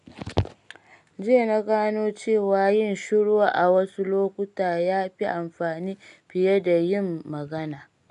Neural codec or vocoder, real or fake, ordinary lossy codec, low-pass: none; real; none; 10.8 kHz